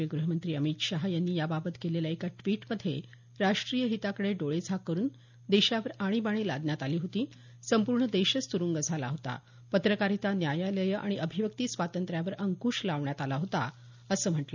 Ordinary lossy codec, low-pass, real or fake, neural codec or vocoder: none; 7.2 kHz; real; none